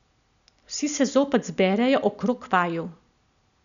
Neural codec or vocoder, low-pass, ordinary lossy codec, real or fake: none; 7.2 kHz; none; real